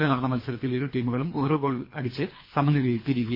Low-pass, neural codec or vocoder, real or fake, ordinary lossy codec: 5.4 kHz; codec, 24 kHz, 3 kbps, HILCodec; fake; MP3, 24 kbps